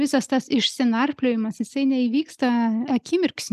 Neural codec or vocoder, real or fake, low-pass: none; real; 14.4 kHz